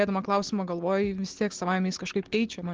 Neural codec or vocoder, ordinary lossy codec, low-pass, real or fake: none; Opus, 16 kbps; 7.2 kHz; real